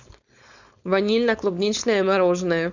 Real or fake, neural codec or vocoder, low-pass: fake; codec, 16 kHz, 4.8 kbps, FACodec; 7.2 kHz